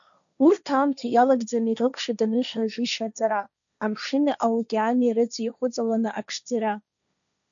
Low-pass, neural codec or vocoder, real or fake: 7.2 kHz; codec, 16 kHz, 1.1 kbps, Voila-Tokenizer; fake